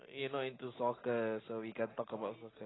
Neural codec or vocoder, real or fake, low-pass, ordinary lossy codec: none; real; 7.2 kHz; AAC, 16 kbps